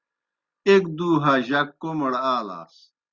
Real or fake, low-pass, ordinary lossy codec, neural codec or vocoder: real; 7.2 kHz; Opus, 64 kbps; none